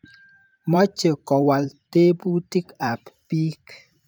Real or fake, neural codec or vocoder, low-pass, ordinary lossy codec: fake; vocoder, 44.1 kHz, 128 mel bands every 512 samples, BigVGAN v2; none; none